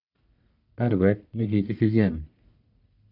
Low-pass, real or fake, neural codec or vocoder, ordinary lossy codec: 5.4 kHz; fake; codec, 44.1 kHz, 1.7 kbps, Pupu-Codec; none